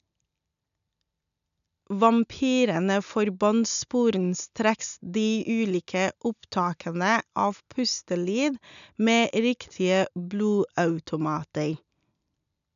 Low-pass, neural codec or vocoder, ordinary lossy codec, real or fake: 7.2 kHz; none; none; real